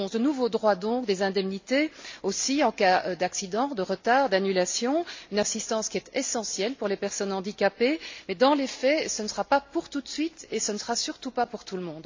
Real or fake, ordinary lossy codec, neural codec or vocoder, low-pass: real; MP3, 64 kbps; none; 7.2 kHz